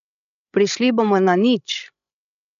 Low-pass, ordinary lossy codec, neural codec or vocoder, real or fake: 7.2 kHz; none; codec, 16 kHz, 16 kbps, FreqCodec, larger model; fake